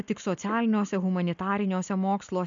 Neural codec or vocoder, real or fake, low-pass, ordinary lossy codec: none; real; 7.2 kHz; MP3, 64 kbps